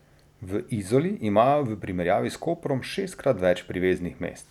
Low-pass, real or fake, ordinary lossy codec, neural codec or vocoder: 19.8 kHz; real; none; none